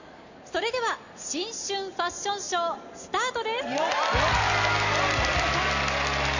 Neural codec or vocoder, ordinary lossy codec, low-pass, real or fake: none; none; 7.2 kHz; real